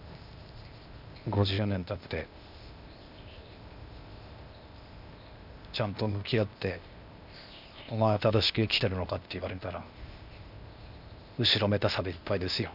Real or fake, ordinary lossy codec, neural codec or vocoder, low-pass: fake; none; codec, 16 kHz, 0.8 kbps, ZipCodec; 5.4 kHz